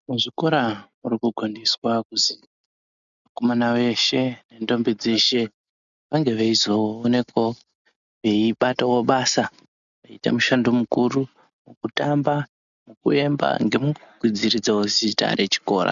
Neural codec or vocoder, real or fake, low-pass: none; real; 7.2 kHz